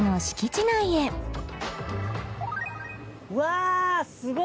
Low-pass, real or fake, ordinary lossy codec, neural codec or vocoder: none; real; none; none